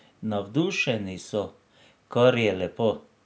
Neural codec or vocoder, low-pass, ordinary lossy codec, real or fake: none; none; none; real